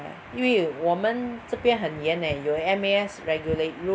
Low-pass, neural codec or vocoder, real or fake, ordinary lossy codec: none; none; real; none